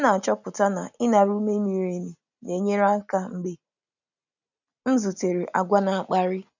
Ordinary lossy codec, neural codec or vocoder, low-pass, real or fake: none; none; 7.2 kHz; real